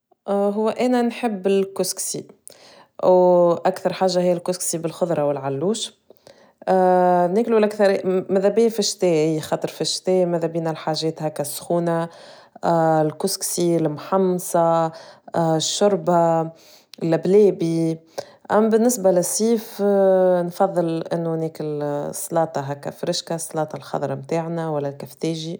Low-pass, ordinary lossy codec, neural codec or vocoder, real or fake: none; none; none; real